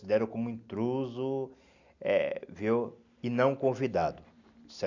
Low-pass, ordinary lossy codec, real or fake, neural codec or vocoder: 7.2 kHz; none; real; none